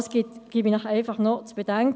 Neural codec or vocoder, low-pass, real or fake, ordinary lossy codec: none; none; real; none